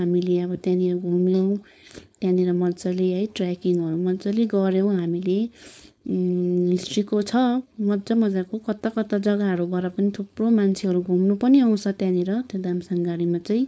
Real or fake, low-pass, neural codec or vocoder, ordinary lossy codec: fake; none; codec, 16 kHz, 4.8 kbps, FACodec; none